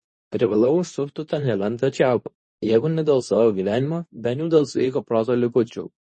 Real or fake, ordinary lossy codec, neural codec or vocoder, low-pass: fake; MP3, 32 kbps; codec, 24 kHz, 0.9 kbps, WavTokenizer, medium speech release version 2; 10.8 kHz